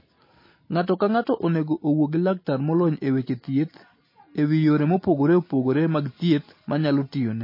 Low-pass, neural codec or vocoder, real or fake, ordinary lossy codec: 5.4 kHz; none; real; MP3, 24 kbps